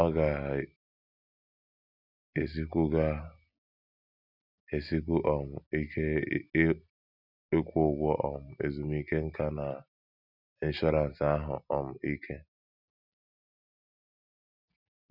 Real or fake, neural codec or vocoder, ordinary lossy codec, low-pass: real; none; none; 5.4 kHz